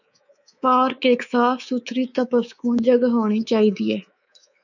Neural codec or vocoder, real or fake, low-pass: codec, 24 kHz, 3.1 kbps, DualCodec; fake; 7.2 kHz